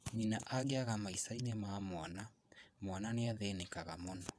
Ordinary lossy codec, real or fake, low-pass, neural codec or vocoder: none; fake; none; vocoder, 22.05 kHz, 80 mel bands, WaveNeXt